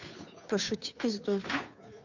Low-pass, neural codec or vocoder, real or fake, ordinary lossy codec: 7.2 kHz; codec, 16 kHz, 2 kbps, FunCodec, trained on Chinese and English, 25 frames a second; fake; none